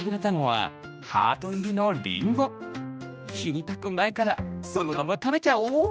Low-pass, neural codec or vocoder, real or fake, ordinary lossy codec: none; codec, 16 kHz, 1 kbps, X-Codec, HuBERT features, trained on general audio; fake; none